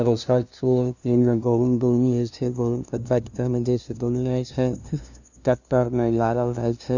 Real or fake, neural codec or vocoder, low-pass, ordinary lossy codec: fake; codec, 16 kHz, 0.5 kbps, FunCodec, trained on LibriTTS, 25 frames a second; 7.2 kHz; none